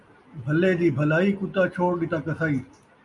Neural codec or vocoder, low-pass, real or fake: none; 10.8 kHz; real